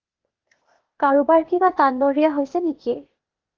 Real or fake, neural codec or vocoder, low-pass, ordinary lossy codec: fake; codec, 16 kHz, 0.8 kbps, ZipCodec; 7.2 kHz; Opus, 24 kbps